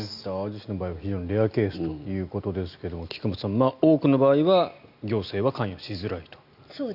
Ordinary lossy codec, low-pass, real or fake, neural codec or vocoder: none; 5.4 kHz; real; none